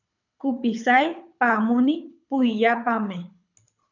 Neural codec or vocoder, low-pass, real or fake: codec, 24 kHz, 6 kbps, HILCodec; 7.2 kHz; fake